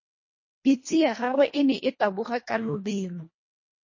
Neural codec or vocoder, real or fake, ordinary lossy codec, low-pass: codec, 24 kHz, 1.5 kbps, HILCodec; fake; MP3, 32 kbps; 7.2 kHz